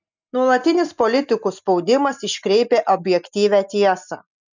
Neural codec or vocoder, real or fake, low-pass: none; real; 7.2 kHz